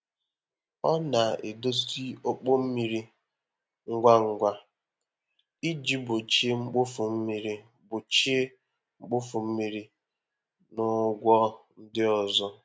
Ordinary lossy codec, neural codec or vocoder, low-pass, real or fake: none; none; none; real